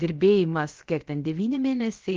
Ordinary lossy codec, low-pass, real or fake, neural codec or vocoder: Opus, 16 kbps; 7.2 kHz; fake; codec, 16 kHz, about 1 kbps, DyCAST, with the encoder's durations